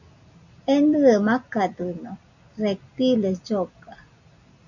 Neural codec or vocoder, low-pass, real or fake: none; 7.2 kHz; real